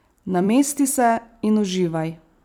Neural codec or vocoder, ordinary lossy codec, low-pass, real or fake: vocoder, 44.1 kHz, 128 mel bands every 256 samples, BigVGAN v2; none; none; fake